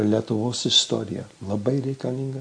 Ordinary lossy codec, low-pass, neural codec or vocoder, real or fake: MP3, 48 kbps; 9.9 kHz; none; real